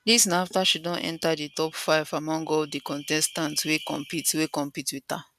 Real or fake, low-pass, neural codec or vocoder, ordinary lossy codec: real; 14.4 kHz; none; none